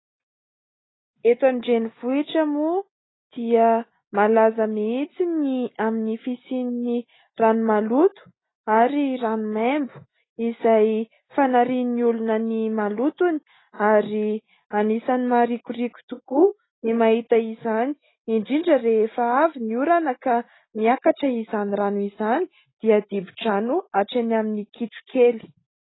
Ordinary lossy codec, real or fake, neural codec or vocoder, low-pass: AAC, 16 kbps; real; none; 7.2 kHz